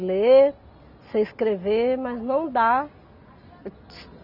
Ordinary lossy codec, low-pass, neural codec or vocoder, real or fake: none; 5.4 kHz; none; real